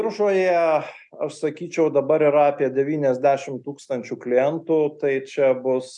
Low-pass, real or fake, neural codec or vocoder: 10.8 kHz; real; none